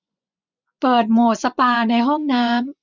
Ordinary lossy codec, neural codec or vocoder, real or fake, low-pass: none; vocoder, 24 kHz, 100 mel bands, Vocos; fake; 7.2 kHz